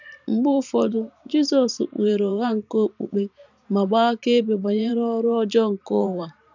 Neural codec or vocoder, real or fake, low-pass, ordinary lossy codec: vocoder, 44.1 kHz, 128 mel bands every 512 samples, BigVGAN v2; fake; 7.2 kHz; none